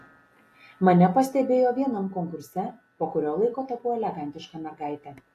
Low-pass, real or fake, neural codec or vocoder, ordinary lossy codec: 14.4 kHz; real; none; AAC, 48 kbps